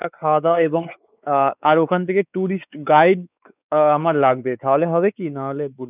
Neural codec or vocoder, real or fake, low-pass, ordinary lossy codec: codec, 16 kHz, 4 kbps, X-Codec, WavLM features, trained on Multilingual LibriSpeech; fake; 3.6 kHz; none